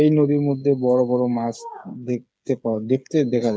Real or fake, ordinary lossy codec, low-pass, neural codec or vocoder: fake; none; none; codec, 16 kHz, 8 kbps, FreqCodec, smaller model